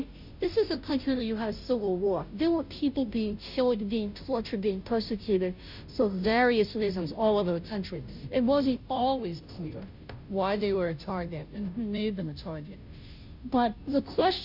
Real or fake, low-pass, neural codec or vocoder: fake; 5.4 kHz; codec, 16 kHz, 0.5 kbps, FunCodec, trained on Chinese and English, 25 frames a second